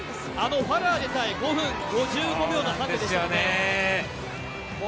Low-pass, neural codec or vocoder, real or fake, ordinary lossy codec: none; none; real; none